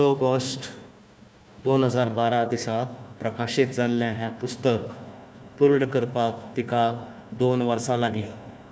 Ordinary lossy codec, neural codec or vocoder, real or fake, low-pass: none; codec, 16 kHz, 1 kbps, FunCodec, trained on Chinese and English, 50 frames a second; fake; none